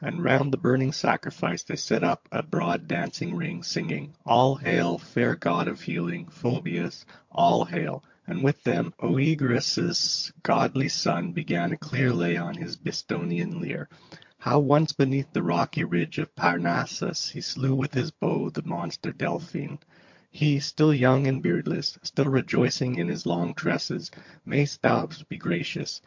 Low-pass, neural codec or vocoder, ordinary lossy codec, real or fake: 7.2 kHz; vocoder, 22.05 kHz, 80 mel bands, HiFi-GAN; MP3, 48 kbps; fake